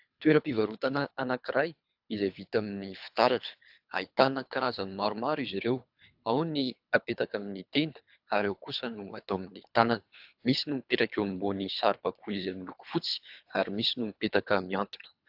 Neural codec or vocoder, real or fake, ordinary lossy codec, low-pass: codec, 24 kHz, 3 kbps, HILCodec; fake; AAC, 48 kbps; 5.4 kHz